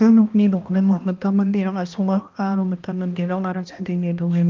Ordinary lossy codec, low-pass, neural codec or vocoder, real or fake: Opus, 24 kbps; 7.2 kHz; codec, 16 kHz, 1 kbps, X-Codec, HuBERT features, trained on balanced general audio; fake